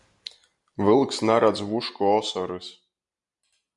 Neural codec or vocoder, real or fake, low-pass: none; real; 10.8 kHz